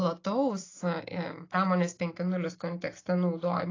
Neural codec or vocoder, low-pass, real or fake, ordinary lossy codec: none; 7.2 kHz; real; AAC, 32 kbps